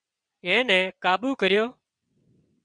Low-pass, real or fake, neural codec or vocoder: 9.9 kHz; fake; vocoder, 22.05 kHz, 80 mel bands, WaveNeXt